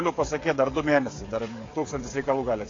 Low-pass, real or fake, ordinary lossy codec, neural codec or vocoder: 7.2 kHz; fake; AAC, 32 kbps; codec, 16 kHz, 16 kbps, FreqCodec, smaller model